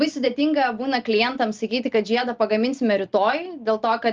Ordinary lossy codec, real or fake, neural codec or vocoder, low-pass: Opus, 32 kbps; real; none; 7.2 kHz